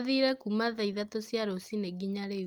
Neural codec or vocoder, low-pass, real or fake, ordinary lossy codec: none; 19.8 kHz; real; Opus, 24 kbps